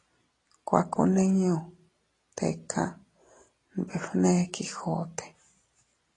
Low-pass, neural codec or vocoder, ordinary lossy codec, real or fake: 10.8 kHz; none; AAC, 32 kbps; real